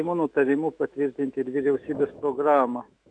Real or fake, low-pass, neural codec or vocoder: fake; 9.9 kHz; autoencoder, 48 kHz, 128 numbers a frame, DAC-VAE, trained on Japanese speech